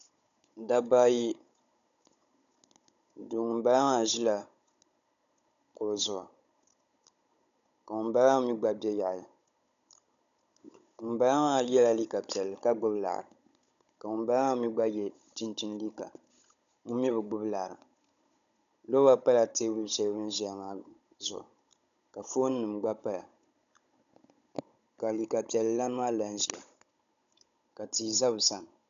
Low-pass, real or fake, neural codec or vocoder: 7.2 kHz; fake; codec, 16 kHz, 16 kbps, FunCodec, trained on Chinese and English, 50 frames a second